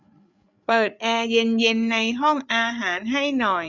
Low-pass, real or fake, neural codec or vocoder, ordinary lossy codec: 7.2 kHz; fake; codec, 16 kHz, 8 kbps, FreqCodec, larger model; none